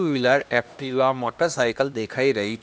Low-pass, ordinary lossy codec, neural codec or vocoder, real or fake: none; none; codec, 16 kHz, 1 kbps, X-Codec, HuBERT features, trained on LibriSpeech; fake